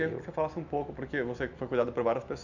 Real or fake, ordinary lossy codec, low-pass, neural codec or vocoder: real; none; 7.2 kHz; none